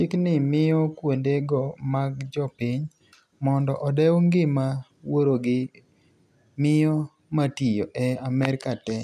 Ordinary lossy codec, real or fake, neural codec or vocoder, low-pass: none; real; none; 14.4 kHz